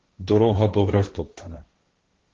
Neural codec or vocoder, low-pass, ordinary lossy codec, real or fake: codec, 16 kHz, 1.1 kbps, Voila-Tokenizer; 7.2 kHz; Opus, 16 kbps; fake